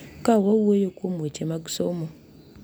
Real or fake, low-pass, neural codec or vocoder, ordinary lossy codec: real; none; none; none